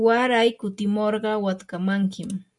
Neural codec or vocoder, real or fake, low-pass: none; real; 10.8 kHz